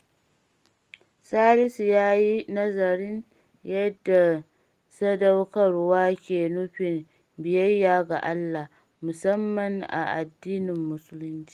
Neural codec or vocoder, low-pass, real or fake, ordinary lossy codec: none; 14.4 kHz; real; Opus, 24 kbps